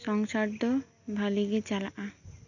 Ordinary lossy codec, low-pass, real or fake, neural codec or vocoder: none; 7.2 kHz; real; none